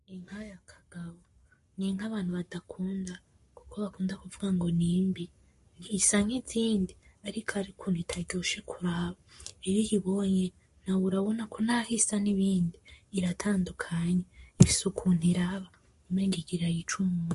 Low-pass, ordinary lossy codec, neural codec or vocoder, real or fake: 14.4 kHz; MP3, 48 kbps; codec, 44.1 kHz, 7.8 kbps, Pupu-Codec; fake